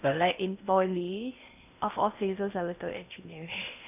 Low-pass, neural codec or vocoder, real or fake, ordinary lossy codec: 3.6 kHz; codec, 16 kHz in and 24 kHz out, 0.6 kbps, FocalCodec, streaming, 4096 codes; fake; none